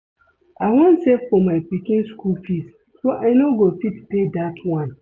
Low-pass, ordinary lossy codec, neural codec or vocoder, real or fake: none; none; none; real